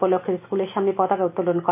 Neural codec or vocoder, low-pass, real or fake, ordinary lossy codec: none; 3.6 kHz; real; none